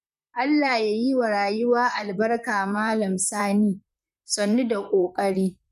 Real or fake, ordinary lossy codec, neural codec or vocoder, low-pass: fake; none; vocoder, 44.1 kHz, 128 mel bands, Pupu-Vocoder; 14.4 kHz